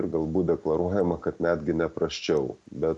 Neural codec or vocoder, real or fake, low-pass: none; real; 10.8 kHz